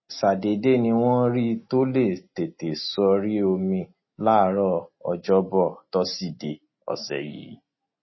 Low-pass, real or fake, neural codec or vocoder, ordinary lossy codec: 7.2 kHz; real; none; MP3, 24 kbps